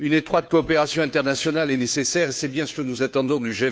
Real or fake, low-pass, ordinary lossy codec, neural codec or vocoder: fake; none; none; codec, 16 kHz, 2 kbps, FunCodec, trained on Chinese and English, 25 frames a second